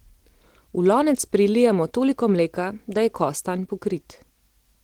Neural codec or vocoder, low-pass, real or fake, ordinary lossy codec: none; 19.8 kHz; real; Opus, 16 kbps